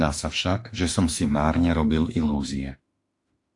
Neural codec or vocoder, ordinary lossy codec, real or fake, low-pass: autoencoder, 48 kHz, 32 numbers a frame, DAC-VAE, trained on Japanese speech; AAC, 48 kbps; fake; 10.8 kHz